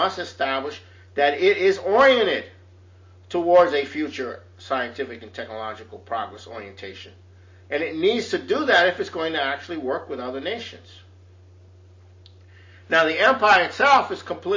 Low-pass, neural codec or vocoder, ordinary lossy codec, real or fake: 7.2 kHz; none; MP3, 32 kbps; real